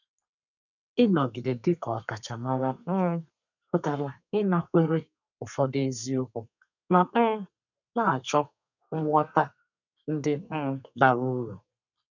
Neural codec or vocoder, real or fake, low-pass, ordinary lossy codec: codec, 32 kHz, 1.9 kbps, SNAC; fake; 7.2 kHz; none